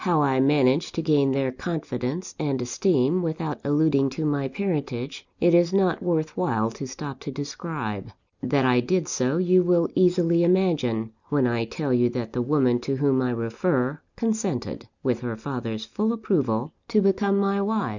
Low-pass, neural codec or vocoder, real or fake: 7.2 kHz; none; real